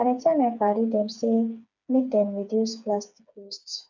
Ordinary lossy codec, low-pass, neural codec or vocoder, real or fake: none; 7.2 kHz; codec, 16 kHz, 4 kbps, FreqCodec, smaller model; fake